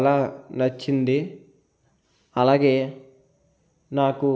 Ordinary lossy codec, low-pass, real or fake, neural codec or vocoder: none; none; real; none